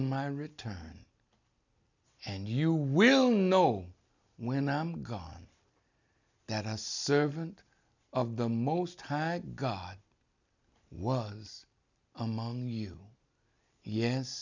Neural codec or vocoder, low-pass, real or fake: none; 7.2 kHz; real